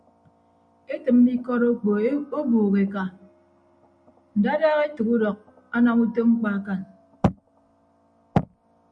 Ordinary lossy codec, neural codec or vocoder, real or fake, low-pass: MP3, 96 kbps; none; real; 9.9 kHz